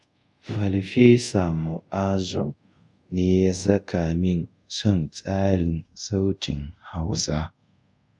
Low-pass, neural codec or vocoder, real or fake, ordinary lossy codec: 10.8 kHz; codec, 24 kHz, 0.5 kbps, DualCodec; fake; none